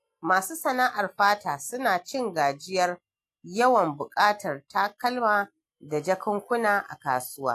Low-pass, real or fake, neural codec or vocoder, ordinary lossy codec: 14.4 kHz; real; none; AAC, 64 kbps